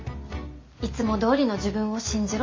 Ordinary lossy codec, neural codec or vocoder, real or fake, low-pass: MP3, 48 kbps; none; real; 7.2 kHz